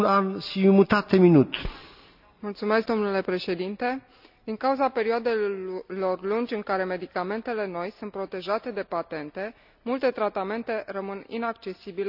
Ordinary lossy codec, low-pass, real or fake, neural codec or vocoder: none; 5.4 kHz; real; none